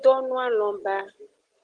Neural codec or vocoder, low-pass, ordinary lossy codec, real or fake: none; 9.9 kHz; Opus, 16 kbps; real